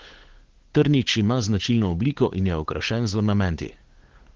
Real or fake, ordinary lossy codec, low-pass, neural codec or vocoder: fake; Opus, 16 kbps; 7.2 kHz; codec, 16 kHz, 8 kbps, FunCodec, trained on Chinese and English, 25 frames a second